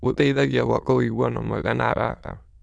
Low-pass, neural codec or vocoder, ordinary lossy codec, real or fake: 9.9 kHz; autoencoder, 22.05 kHz, a latent of 192 numbers a frame, VITS, trained on many speakers; none; fake